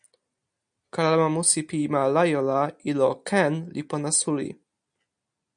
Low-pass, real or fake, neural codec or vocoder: 9.9 kHz; real; none